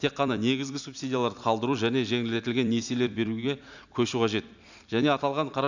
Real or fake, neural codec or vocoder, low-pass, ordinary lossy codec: fake; vocoder, 44.1 kHz, 128 mel bands every 256 samples, BigVGAN v2; 7.2 kHz; none